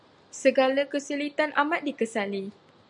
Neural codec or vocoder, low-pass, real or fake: none; 10.8 kHz; real